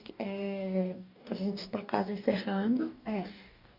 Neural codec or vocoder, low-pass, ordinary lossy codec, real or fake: codec, 44.1 kHz, 2.6 kbps, DAC; 5.4 kHz; none; fake